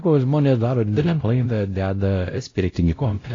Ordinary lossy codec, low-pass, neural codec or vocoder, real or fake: AAC, 32 kbps; 7.2 kHz; codec, 16 kHz, 0.5 kbps, X-Codec, WavLM features, trained on Multilingual LibriSpeech; fake